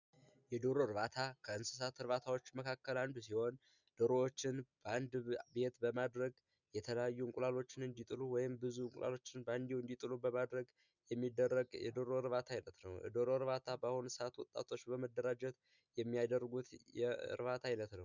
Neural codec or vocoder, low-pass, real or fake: none; 7.2 kHz; real